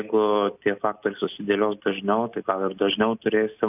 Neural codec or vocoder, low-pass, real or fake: none; 3.6 kHz; real